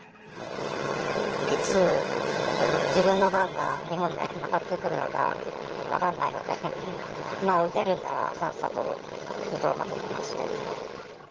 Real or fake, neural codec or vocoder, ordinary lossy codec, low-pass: fake; vocoder, 22.05 kHz, 80 mel bands, HiFi-GAN; Opus, 16 kbps; 7.2 kHz